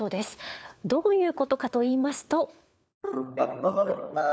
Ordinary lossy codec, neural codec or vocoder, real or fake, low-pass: none; codec, 16 kHz, 4 kbps, FunCodec, trained on LibriTTS, 50 frames a second; fake; none